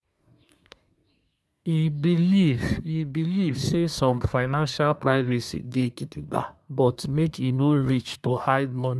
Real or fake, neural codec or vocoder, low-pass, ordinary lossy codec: fake; codec, 24 kHz, 1 kbps, SNAC; none; none